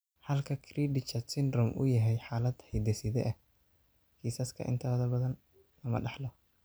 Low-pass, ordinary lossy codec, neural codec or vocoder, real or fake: none; none; none; real